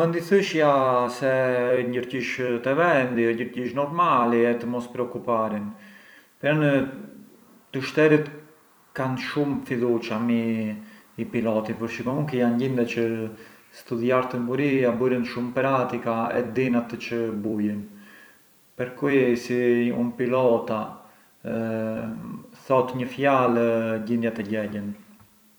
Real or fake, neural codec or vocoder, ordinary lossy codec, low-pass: fake; vocoder, 44.1 kHz, 128 mel bands every 256 samples, BigVGAN v2; none; none